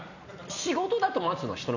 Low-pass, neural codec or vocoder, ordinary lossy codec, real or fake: 7.2 kHz; none; none; real